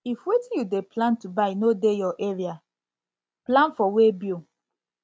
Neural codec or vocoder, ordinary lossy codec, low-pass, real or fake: none; none; none; real